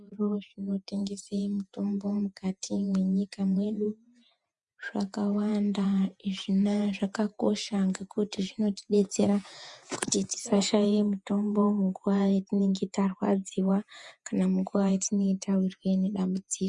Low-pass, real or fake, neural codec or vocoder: 10.8 kHz; fake; vocoder, 48 kHz, 128 mel bands, Vocos